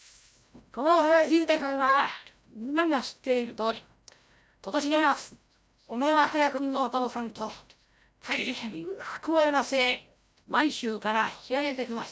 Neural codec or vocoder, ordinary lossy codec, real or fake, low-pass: codec, 16 kHz, 0.5 kbps, FreqCodec, larger model; none; fake; none